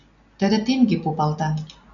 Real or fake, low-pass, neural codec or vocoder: real; 7.2 kHz; none